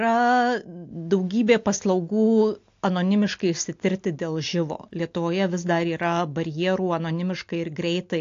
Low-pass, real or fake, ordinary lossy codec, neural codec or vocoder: 7.2 kHz; real; AAC, 48 kbps; none